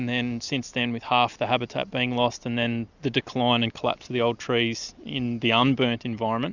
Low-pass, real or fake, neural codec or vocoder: 7.2 kHz; real; none